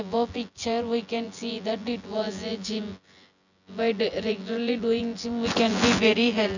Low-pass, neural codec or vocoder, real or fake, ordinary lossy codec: 7.2 kHz; vocoder, 24 kHz, 100 mel bands, Vocos; fake; none